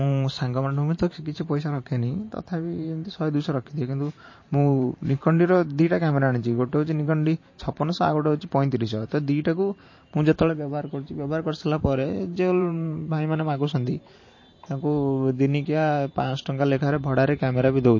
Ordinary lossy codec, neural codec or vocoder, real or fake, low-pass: MP3, 32 kbps; vocoder, 44.1 kHz, 128 mel bands every 512 samples, BigVGAN v2; fake; 7.2 kHz